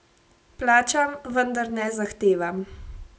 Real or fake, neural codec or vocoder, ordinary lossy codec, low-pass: real; none; none; none